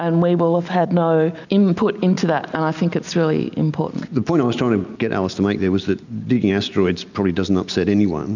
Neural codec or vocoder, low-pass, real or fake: none; 7.2 kHz; real